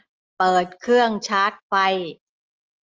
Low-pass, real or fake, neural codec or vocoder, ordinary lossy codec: 7.2 kHz; real; none; Opus, 24 kbps